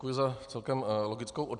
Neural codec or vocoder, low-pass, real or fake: none; 9.9 kHz; real